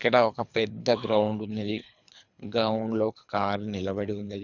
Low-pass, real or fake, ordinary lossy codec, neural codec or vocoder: 7.2 kHz; fake; none; codec, 24 kHz, 3 kbps, HILCodec